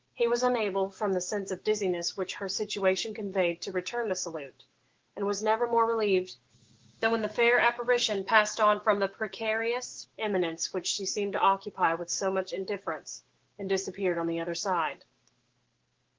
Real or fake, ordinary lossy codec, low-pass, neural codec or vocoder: fake; Opus, 16 kbps; 7.2 kHz; autoencoder, 48 kHz, 128 numbers a frame, DAC-VAE, trained on Japanese speech